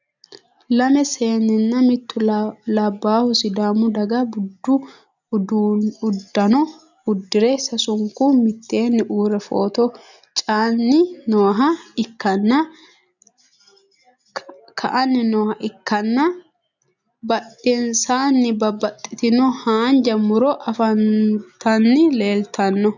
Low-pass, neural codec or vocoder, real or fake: 7.2 kHz; none; real